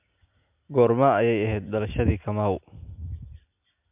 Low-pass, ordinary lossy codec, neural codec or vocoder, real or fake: 3.6 kHz; MP3, 32 kbps; none; real